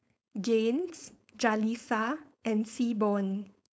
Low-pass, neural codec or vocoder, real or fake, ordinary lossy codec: none; codec, 16 kHz, 4.8 kbps, FACodec; fake; none